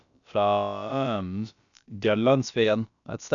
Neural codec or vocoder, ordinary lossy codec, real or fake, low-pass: codec, 16 kHz, about 1 kbps, DyCAST, with the encoder's durations; none; fake; 7.2 kHz